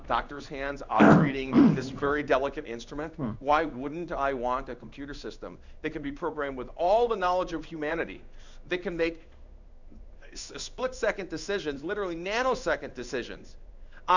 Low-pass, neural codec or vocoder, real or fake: 7.2 kHz; codec, 16 kHz in and 24 kHz out, 1 kbps, XY-Tokenizer; fake